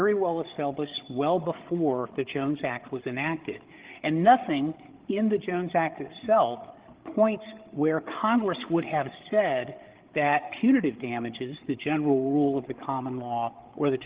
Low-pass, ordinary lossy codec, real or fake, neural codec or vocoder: 3.6 kHz; Opus, 16 kbps; fake; codec, 16 kHz, 8 kbps, FreqCodec, larger model